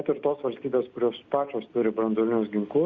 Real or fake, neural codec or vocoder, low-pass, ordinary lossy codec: real; none; 7.2 kHz; Opus, 64 kbps